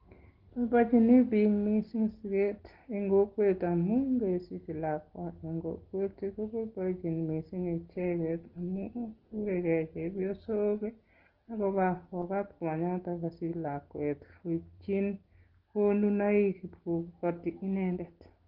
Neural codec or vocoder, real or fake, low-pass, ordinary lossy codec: none; real; 5.4 kHz; Opus, 16 kbps